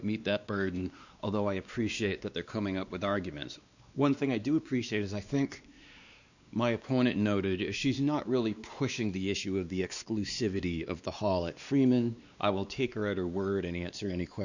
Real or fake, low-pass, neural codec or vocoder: fake; 7.2 kHz; codec, 16 kHz, 2 kbps, X-Codec, WavLM features, trained on Multilingual LibriSpeech